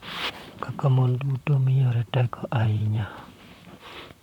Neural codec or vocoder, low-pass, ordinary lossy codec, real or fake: vocoder, 44.1 kHz, 128 mel bands, Pupu-Vocoder; 19.8 kHz; none; fake